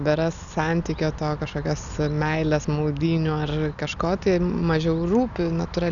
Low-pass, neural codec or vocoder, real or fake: 7.2 kHz; none; real